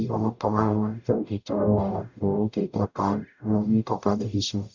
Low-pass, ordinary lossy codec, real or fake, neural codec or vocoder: 7.2 kHz; none; fake; codec, 44.1 kHz, 0.9 kbps, DAC